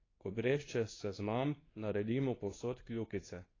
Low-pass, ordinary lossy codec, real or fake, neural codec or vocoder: 7.2 kHz; AAC, 32 kbps; fake; codec, 24 kHz, 0.9 kbps, WavTokenizer, medium speech release version 2